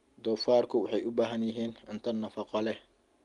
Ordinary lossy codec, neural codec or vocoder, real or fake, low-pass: Opus, 24 kbps; none; real; 10.8 kHz